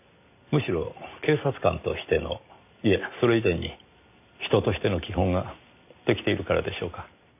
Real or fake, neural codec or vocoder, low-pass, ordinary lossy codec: real; none; 3.6 kHz; none